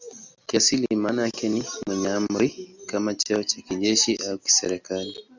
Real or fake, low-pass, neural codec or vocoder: real; 7.2 kHz; none